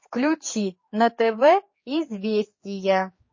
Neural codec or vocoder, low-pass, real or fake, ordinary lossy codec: codec, 16 kHz, 4 kbps, X-Codec, HuBERT features, trained on general audio; 7.2 kHz; fake; MP3, 32 kbps